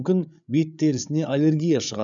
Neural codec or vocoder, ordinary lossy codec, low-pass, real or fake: codec, 16 kHz, 8 kbps, FreqCodec, larger model; none; 7.2 kHz; fake